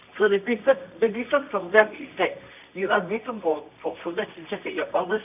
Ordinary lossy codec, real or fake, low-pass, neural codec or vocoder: none; fake; 3.6 kHz; codec, 24 kHz, 0.9 kbps, WavTokenizer, medium music audio release